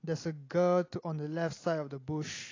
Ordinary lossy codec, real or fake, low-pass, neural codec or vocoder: AAC, 32 kbps; real; 7.2 kHz; none